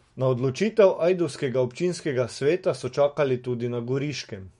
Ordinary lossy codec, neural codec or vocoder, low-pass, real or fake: MP3, 48 kbps; autoencoder, 48 kHz, 128 numbers a frame, DAC-VAE, trained on Japanese speech; 19.8 kHz; fake